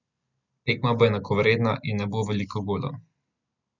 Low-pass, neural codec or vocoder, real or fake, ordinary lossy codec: 7.2 kHz; none; real; none